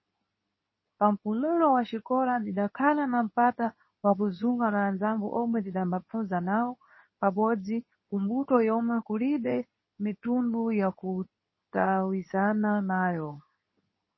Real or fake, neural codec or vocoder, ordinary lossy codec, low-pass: fake; codec, 24 kHz, 0.9 kbps, WavTokenizer, medium speech release version 2; MP3, 24 kbps; 7.2 kHz